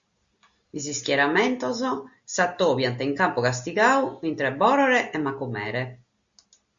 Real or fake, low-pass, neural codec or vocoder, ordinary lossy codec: real; 7.2 kHz; none; Opus, 64 kbps